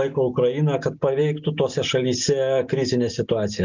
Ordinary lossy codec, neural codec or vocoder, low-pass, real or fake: MP3, 64 kbps; none; 7.2 kHz; real